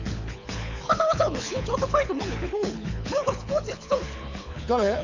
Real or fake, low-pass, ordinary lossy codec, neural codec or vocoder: fake; 7.2 kHz; none; codec, 24 kHz, 6 kbps, HILCodec